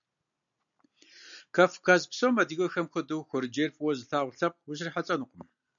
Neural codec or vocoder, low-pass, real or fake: none; 7.2 kHz; real